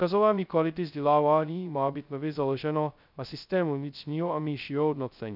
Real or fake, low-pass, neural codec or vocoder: fake; 5.4 kHz; codec, 16 kHz, 0.2 kbps, FocalCodec